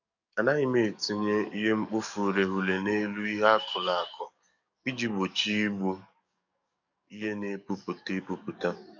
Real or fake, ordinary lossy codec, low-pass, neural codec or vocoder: fake; none; 7.2 kHz; codec, 44.1 kHz, 7.8 kbps, DAC